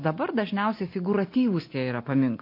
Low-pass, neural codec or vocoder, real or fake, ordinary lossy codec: 5.4 kHz; none; real; MP3, 32 kbps